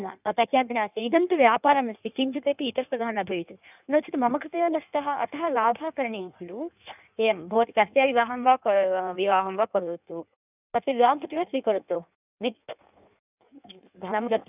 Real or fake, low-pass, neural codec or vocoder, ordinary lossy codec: fake; 3.6 kHz; codec, 16 kHz in and 24 kHz out, 1.1 kbps, FireRedTTS-2 codec; none